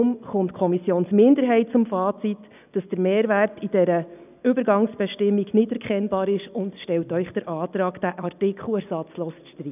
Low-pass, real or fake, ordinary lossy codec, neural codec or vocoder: 3.6 kHz; real; none; none